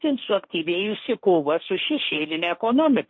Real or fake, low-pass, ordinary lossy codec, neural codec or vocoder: fake; 7.2 kHz; MP3, 32 kbps; codec, 16 kHz, 1.1 kbps, Voila-Tokenizer